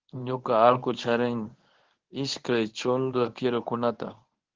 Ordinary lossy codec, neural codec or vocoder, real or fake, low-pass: Opus, 16 kbps; codec, 24 kHz, 0.9 kbps, WavTokenizer, medium speech release version 1; fake; 7.2 kHz